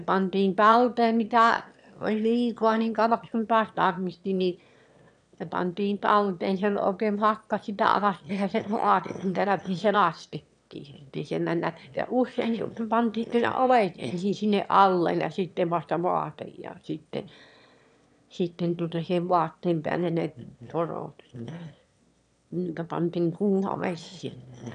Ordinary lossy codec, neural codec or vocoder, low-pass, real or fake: none; autoencoder, 22.05 kHz, a latent of 192 numbers a frame, VITS, trained on one speaker; 9.9 kHz; fake